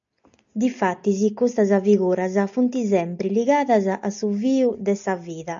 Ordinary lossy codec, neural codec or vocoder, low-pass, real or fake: AAC, 64 kbps; none; 7.2 kHz; real